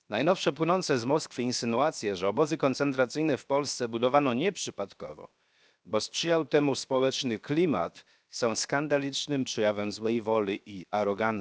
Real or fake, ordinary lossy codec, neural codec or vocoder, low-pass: fake; none; codec, 16 kHz, 0.7 kbps, FocalCodec; none